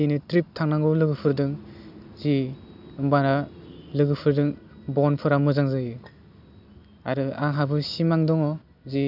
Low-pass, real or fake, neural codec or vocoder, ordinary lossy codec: 5.4 kHz; real; none; none